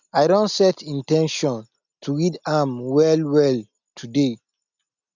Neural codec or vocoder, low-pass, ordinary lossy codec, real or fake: none; 7.2 kHz; none; real